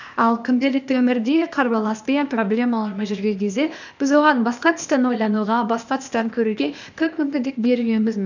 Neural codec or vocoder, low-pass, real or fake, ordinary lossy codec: codec, 16 kHz, 0.8 kbps, ZipCodec; 7.2 kHz; fake; none